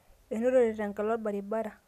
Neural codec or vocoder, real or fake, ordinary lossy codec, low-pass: none; real; none; 14.4 kHz